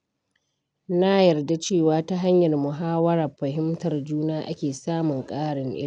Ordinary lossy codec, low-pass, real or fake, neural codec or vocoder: none; 9.9 kHz; real; none